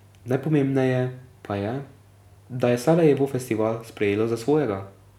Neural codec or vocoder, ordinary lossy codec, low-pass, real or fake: none; none; 19.8 kHz; real